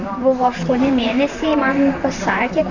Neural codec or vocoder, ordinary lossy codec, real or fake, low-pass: vocoder, 44.1 kHz, 128 mel bands, Pupu-Vocoder; Opus, 64 kbps; fake; 7.2 kHz